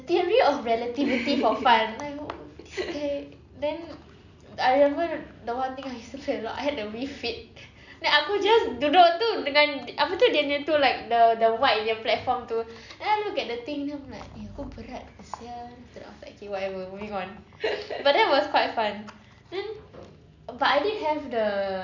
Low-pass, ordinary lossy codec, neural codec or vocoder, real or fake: 7.2 kHz; none; none; real